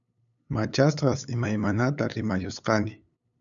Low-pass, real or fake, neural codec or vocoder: 7.2 kHz; fake; codec, 16 kHz, 8 kbps, FunCodec, trained on LibriTTS, 25 frames a second